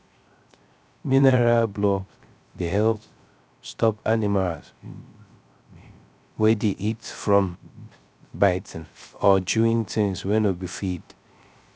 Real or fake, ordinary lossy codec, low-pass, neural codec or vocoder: fake; none; none; codec, 16 kHz, 0.3 kbps, FocalCodec